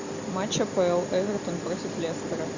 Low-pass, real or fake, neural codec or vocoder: 7.2 kHz; real; none